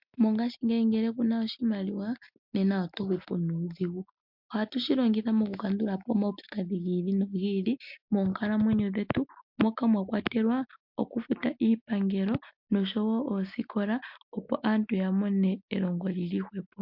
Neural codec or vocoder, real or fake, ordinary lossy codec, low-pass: none; real; MP3, 48 kbps; 5.4 kHz